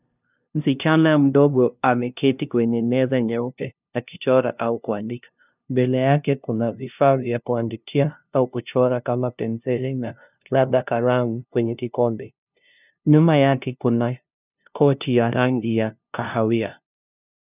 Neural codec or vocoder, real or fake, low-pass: codec, 16 kHz, 0.5 kbps, FunCodec, trained on LibriTTS, 25 frames a second; fake; 3.6 kHz